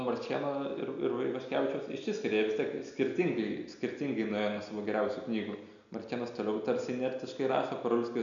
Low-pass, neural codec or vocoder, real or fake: 7.2 kHz; none; real